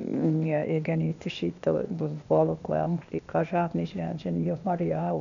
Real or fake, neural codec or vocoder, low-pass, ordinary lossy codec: fake; codec, 16 kHz, 0.8 kbps, ZipCodec; 7.2 kHz; none